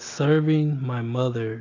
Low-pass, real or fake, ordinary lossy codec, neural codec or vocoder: 7.2 kHz; real; AAC, 32 kbps; none